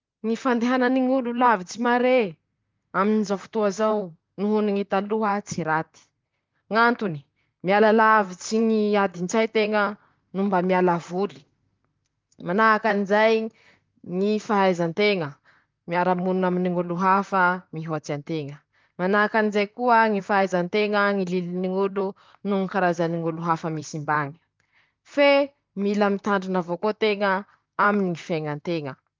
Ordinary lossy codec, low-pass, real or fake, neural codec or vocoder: Opus, 24 kbps; 7.2 kHz; fake; vocoder, 44.1 kHz, 128 mel bands, Pupu-Vocoder